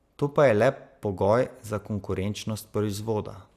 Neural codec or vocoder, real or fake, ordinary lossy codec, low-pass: none; real; Opus, 64 kbps; 14.4 kHz